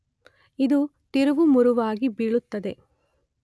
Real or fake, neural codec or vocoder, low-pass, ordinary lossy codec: real; none; none; none